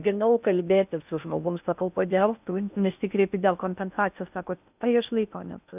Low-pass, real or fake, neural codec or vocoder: 3.6 kHz; fake; codec, 16 kHz in and 24 kHz out, 0.6 kbps, FocalCodec, streaming, 4096 codes